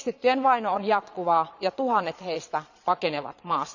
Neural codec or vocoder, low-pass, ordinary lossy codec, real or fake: vocoder, 22.05 kHz, 80 mel bands, Vocos; 7.2 kHz; none; fake